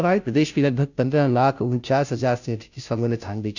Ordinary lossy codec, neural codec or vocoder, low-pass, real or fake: none; codec, 16 kHz, 0.5 kbps, FunCodec, trained on Chinese and English, 25 frames a second; 7.2 kHz; fake